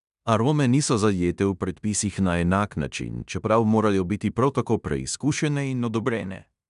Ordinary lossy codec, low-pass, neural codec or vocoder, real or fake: none; 10.8 kHz; codec, 16 kHz in and 24 kHz out, 0.9 kbps, LongCat-Audio-Codec, fine tuned four codebook decoder; fake